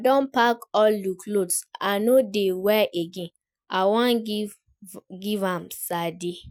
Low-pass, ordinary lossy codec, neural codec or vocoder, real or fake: 19.8 kHz; none; none; real